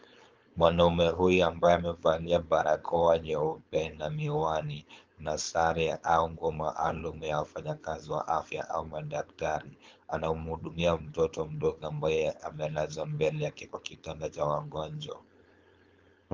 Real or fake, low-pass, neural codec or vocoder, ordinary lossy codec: fake; 7.2 kHz; codec, 16 kHz, 4.8 kbps, FACodec; Opus, 32 kbps